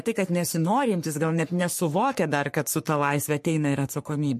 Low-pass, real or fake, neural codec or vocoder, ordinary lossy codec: 14.4 kHz; fake; codec, 44.1 kHz, 3.4 kbps, Pupu-Codec; MP3, 64 kbps